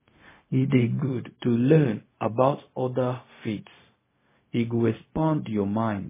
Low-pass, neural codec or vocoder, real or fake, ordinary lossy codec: 3.6 kHz; codec, 16 kHz, 0.4 kbps, LongCat-Audio-Codec; fake; MP3, 16 kbps